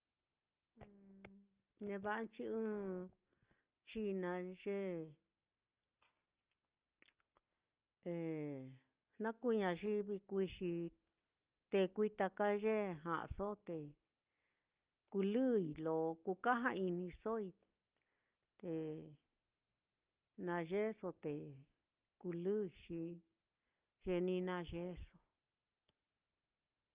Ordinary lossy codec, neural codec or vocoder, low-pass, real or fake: Opus, 32 kbps; none; 3.6 kHz; real